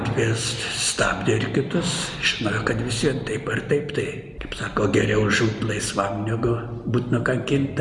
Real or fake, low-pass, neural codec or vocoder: real; 10.8 kHz; none